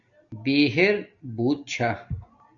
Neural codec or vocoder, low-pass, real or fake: none; 7.2 kHz; real